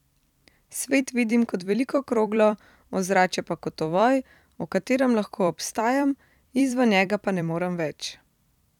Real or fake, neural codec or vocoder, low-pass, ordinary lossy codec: fake; vocoder, 44.1 kHz, 128 mel bands every 256 samples, BigVGAN v2; 19.8 kHz; none